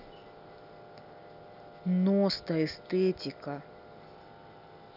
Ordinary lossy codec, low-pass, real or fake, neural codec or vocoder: none; 5.4 kHz; real; none